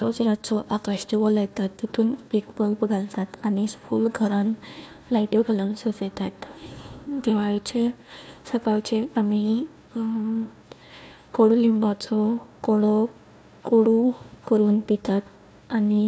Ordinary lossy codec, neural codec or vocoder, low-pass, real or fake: none; codec, 16 kHz, 1 kbps, FunCodec, trained on Chinese and English, 50 frames a second; none; fake